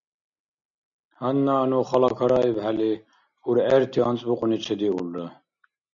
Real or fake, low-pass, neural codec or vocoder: real; 7.2 kHz; none